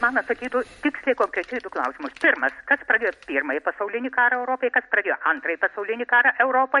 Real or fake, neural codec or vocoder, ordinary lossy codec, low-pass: real; none; MP3, 48 kbps; 14.4 kHz